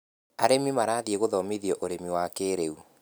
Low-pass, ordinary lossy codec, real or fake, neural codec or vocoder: none; none; real; none